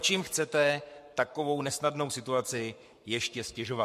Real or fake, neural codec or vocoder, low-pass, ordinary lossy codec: fake; codec, 44.1 kHz, 7.8 kbps, Pupu-Codec; 14.4 kHz; MP3, 64 kbps